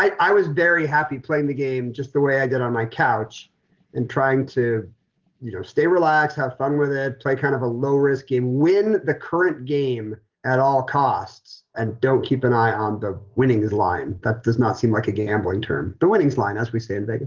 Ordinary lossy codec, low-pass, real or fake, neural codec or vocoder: Opus, 16 kbps; 7.2 kHz; real; none